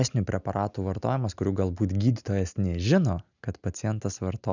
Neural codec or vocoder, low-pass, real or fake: none; 7.2 kHz; real